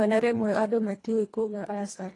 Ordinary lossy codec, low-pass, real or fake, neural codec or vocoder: AAC, 32 kbps; 10.8 kHz; fake; codec, 24 kHz, 1.5 kbps, HILCodec